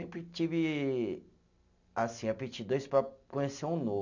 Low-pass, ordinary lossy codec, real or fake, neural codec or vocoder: 7.2 kHz; none; real; none